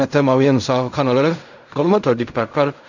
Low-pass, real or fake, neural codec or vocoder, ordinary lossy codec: 7.2 kHz; fake; codec, 16 kHz in and 24 kHz out, 0.4 kbps, LongCat-Audio-Codec, fine tuned four codebook decoder; none